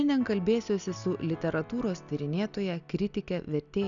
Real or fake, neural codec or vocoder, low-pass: real; none; 7.2 kHz